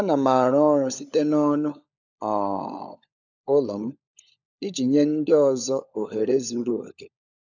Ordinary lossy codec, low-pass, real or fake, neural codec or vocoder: none; 7.2 kHz; fake; codec, 16 kHz, 16 kbps, FunCodec, trained on LibriTTS, 50 frames a second